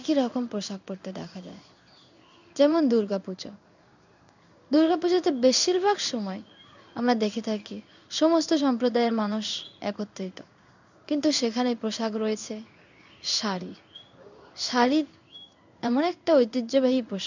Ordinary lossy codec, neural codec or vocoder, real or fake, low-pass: none; codec, 16 kHz in and 24 kHz out, 1 kbps, XY-Tokenizer; fake; 7.2 kHz